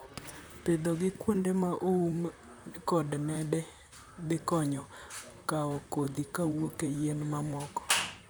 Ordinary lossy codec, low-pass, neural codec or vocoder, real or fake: none; none; vocoder, 44.1 kHz, 128 mel bands, Pupu-Vocoder; fake